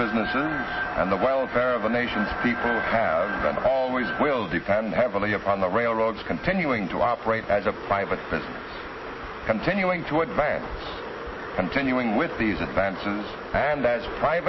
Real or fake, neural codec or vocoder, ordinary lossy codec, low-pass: real; none; MP3, 24 kbps; 7.2 kHz